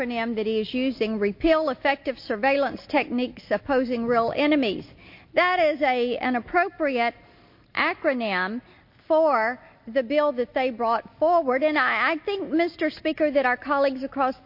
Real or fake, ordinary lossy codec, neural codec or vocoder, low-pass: real; MP3, 32 kbps; none; 5.4 kHz